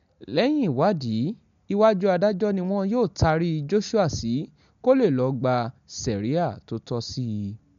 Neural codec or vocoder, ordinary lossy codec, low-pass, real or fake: none; MP3, 64 kbps; 7.2 kHz; real